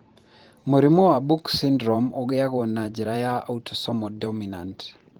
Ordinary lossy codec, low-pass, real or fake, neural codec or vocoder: Opus, 24 kbps; 19.8 kHz; fake; vocoder, 44.1 kHz, 128 mel bands every 512 samples, BigVGAN v2